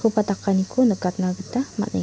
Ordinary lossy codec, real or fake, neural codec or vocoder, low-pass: none; real; none; none